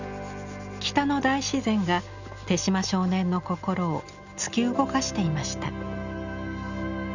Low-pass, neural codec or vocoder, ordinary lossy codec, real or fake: 7.2 kHz; none; none; real